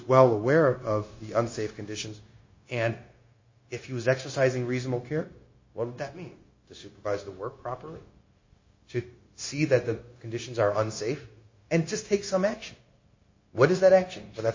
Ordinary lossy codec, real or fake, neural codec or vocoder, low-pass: MP3, 32 kbps; fake; codec, 16 kHz, 0.9 kbps, LongCat-Audio-Codec; 7.2 kHz